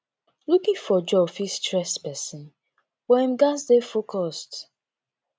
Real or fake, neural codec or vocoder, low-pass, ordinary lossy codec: real; none; none; none